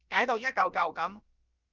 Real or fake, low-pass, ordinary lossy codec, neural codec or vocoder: fake; 7.2 kHz; Opus, 24 kbps; codec, 16 kHz, about 1 kbps, DyCAST, with the encoder's durations